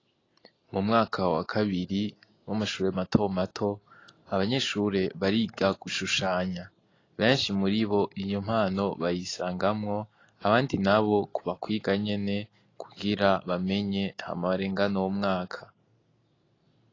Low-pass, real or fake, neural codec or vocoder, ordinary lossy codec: 7.2 kHz; real; none; AAC, 32 kbps